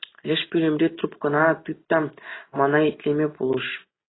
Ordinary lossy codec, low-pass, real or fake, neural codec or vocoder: AAC, 16 kbps; 7.2 kHz; real; none